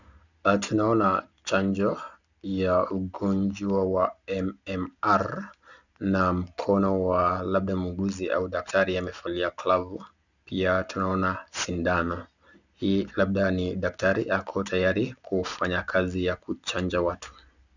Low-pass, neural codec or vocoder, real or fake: 7.2 kHz; none; real